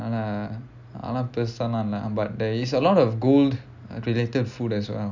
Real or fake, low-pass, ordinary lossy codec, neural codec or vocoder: real; 7.2 kHz; none; none